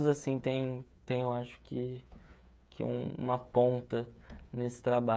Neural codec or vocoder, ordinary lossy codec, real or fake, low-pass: codec, 16 kHz, 8 kbps, FreqCodec, smaller model; none; fake; none